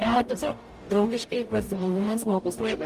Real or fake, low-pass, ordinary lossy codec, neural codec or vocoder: fake; 14.4 kHz; Opus, 32 kbps; codec, 44.1 kHz, 0.9 kbps, DAC